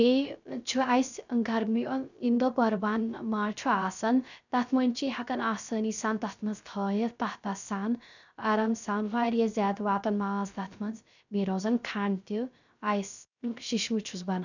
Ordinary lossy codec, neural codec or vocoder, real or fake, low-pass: none; codec, 16 kHz, 0.3 kbps, FocalCodec; fake; 7.2 kHz